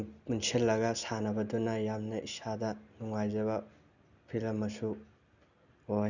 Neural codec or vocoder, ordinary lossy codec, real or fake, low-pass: none; none; real; 7.2 kHz